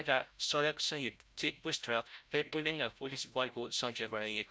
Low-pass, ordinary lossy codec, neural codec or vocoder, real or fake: none; none; codec, 16 kHz, 0.5 kbps, FreqCodec, larger model; fake